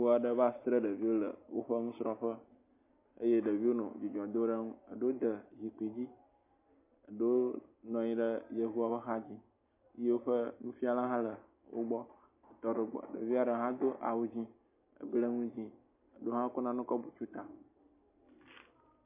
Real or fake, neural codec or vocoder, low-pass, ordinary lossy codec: real; none; 3.6 kHz; MP3, 24 kbps